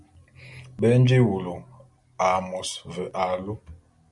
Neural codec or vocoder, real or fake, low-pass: none; real; 10.8 kHz